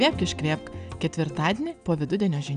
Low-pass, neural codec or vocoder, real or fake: 9.9 kHz; none; real